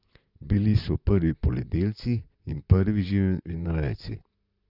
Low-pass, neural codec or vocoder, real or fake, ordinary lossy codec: 5.4 kHz; vocoder, 44.1 kHz, 128 mel bands, Pupu-Vocoder; fake; Opus, 64 kbps